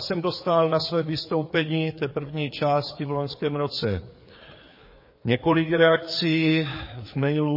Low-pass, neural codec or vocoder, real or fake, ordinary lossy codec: 5.4 kHz; codec, 16 kHz, 4 kbps, FreqCodec, larger model; fake; MP3, 24 kbps